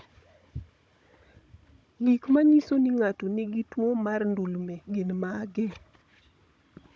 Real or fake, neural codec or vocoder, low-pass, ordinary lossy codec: fake; codec, 16 kHz, 16 kbps, FunCodec, trained on Chinese and English, 50 frames a second; none; none